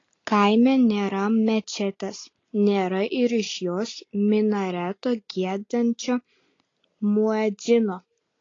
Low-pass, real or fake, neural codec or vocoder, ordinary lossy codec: 7.2 kHz; real; none; AAC, 32 kbps